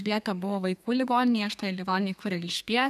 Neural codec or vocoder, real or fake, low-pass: codec, 32 kHz, 1.9 kbps, SNAC; fake; 14.4 kHz